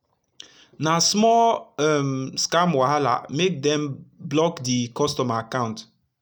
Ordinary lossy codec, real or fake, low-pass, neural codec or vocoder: none; real; none; none